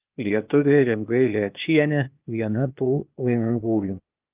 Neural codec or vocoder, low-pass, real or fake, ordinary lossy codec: codec, 16 kHz, 0.8 kbps, ZipCodec; 3.6 kHz; fake; Opus, 24 kbps